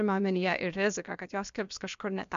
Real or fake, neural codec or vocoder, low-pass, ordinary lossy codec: fake; codec, 16 kHz, about 1 kbps, DyCAST, with the encoder's durations; 7.2 kHz; MP3, 64 kbps